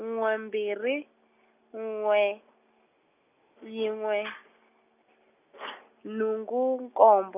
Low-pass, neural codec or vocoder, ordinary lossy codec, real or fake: 3.6 kHz; none; none; real